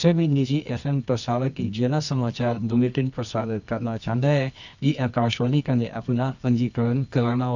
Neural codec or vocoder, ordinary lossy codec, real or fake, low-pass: codec, 24 kHz, 0.9 kbps, WavTokenizer, medium music audio release; none; fake; 7.2 kHz